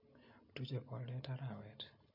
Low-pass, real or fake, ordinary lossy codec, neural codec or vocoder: 5.4 kHz; real; MP3, 32 kbps; none